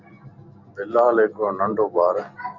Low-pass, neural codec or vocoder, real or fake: 7.2 kHz; none; real